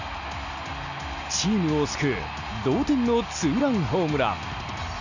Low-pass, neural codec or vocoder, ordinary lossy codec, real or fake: 7.2 kHz; none; none; real